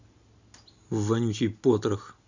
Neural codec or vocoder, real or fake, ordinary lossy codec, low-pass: none; real; Opus, 64 kbps; 7.2 kHz